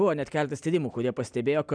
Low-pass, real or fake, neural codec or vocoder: 9.9 kHz; real; none